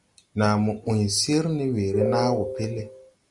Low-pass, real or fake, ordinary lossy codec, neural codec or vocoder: 10.8 kHz; real; Opus, 64 kbps; none